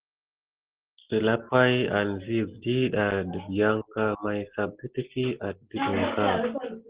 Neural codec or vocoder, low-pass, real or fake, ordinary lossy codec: none; 3.6 kHz; real; Opus, 16 kbps